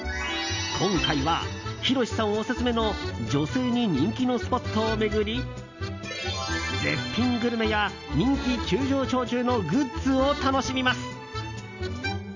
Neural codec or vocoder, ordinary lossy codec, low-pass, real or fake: none; none; 7.2 kHz; real